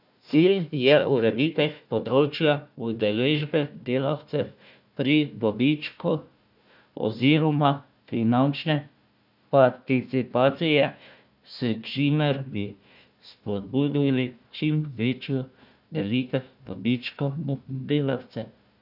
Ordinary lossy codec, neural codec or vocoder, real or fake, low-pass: none; codec, 16 kHz, 1 kbps, FunCodec, trained on Chinese and English, 50 frames a second; fake; 5.4 kHz